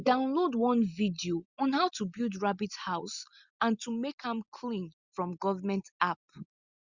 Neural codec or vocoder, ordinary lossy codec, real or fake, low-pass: none; Opus, 64 kbps; real; 7.2 kHz